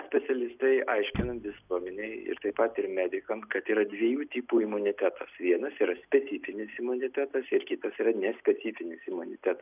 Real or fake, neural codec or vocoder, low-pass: real; none; 3.6 kHz